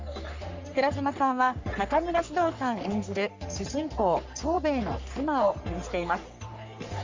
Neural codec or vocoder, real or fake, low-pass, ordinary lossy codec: codec, 44.1 kHz, 3.4 kbps, Pupu-Codec; fake; 7.2 kHz; MP3, 64 kbps